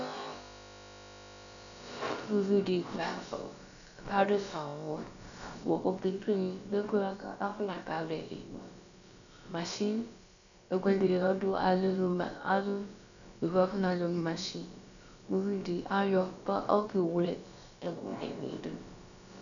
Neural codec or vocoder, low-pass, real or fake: codec, 16 kHz, about 1 kbps, DyCAST, with the encoder's durations; 7.2 kHz; fake